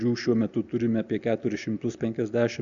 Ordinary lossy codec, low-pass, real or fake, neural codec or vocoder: Opus, 64 kbps; 7.2 kHz; real; none